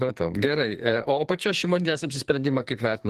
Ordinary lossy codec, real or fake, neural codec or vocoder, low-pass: Opus, 24 kbps; fake; codec, 44.1 kHz, 2.6 kbps, SNAC; 14.4 kHz